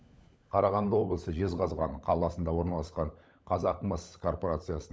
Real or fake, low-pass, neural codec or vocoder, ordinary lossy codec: fake; none; codec, 16 kHz, 16 kbps, FunCodec, trained on LibriTTS, 50 frames a second; none